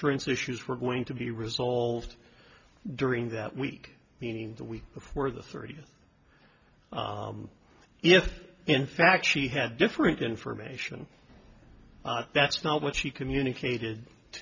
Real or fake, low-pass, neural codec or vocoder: real; 7.2 kHz; none